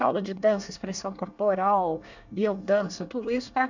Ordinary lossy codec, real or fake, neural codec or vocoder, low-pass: none; fake; codec, 24 kHz, 1 kbps, SNAC; 7.2 kHz